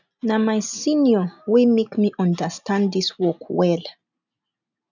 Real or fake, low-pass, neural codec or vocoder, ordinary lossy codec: real; 7.2 kHz; none; none